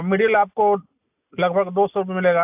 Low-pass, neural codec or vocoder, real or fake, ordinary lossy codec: 3.6 kHz; none; real; AAC, 32 kbps